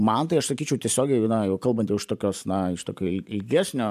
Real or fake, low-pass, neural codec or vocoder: fake; 14.4 kHz; vocoder, 44.1 kHz, 128 mel bands every 512 samples, BigVGAN v2